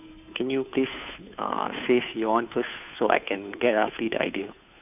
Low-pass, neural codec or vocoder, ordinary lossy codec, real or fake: 3.6 kHz; codec, 16 kHz, 2 kbps, FunCodec, trained on Chinese and English, 25 frames a second; none; fake